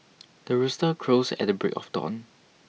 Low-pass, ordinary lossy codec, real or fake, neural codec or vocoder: none; none; real; none